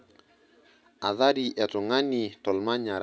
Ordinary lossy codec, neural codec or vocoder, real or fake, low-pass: none; none; real; none